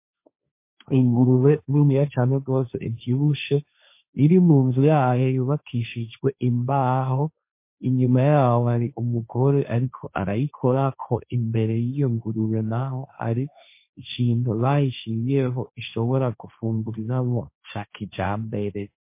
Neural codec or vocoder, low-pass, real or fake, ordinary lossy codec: codec, 16 kHz, 1.1 kbps, Voila-Tokenizer; 3.6 kHz; fake; MP3, 24 kbps